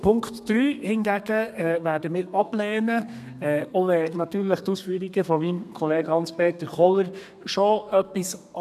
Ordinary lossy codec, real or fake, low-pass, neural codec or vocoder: none; fake; 14.4 kHz; codec, 32 kHz, 1.9 kbps, SNAC